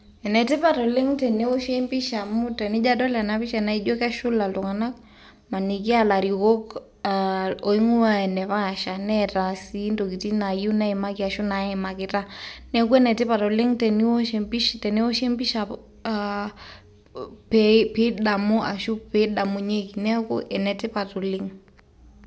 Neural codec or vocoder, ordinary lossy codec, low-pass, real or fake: none; none; none; real